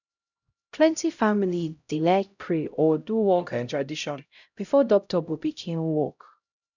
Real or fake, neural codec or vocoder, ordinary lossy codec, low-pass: fake; codec, 16 kHz, 0.5 kbps, X-Codec, HuBERT features, trained on LibriSpeech; none; 7.2 kHz